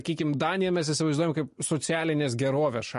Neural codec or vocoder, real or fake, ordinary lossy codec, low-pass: none; real; MP3, 48 kbps; 14.4 kHz